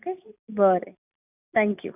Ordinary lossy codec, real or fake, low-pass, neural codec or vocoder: none; real; 3.6 kHz; none